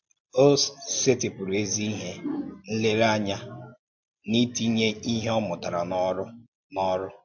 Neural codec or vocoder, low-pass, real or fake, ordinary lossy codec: none; 7.2 kHz; real; MP3, 48 kbps